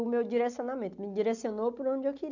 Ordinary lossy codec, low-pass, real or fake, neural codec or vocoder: none; 7.2 kHz; real; none